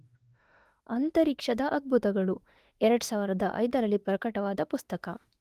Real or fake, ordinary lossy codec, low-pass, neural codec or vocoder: fake; Opus, 24 kbps; 14.4 kHz; autoencoder, 48 kHz, 32 numbers a frame, DAC-VAE, trained on Japanese speech